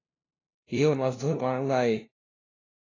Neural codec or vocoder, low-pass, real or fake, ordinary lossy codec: codec, 16 kHz, 0.5 kbps, FunCodec, trained on LibriTTS, 25 frames a second; 7.2 kHz; fake; AAC, 32 kbps